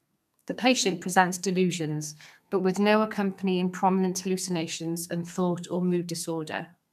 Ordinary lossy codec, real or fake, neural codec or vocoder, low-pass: none; fake; codec, 32 kHz, 1.9 kbps, SNAC; 14.4 kHz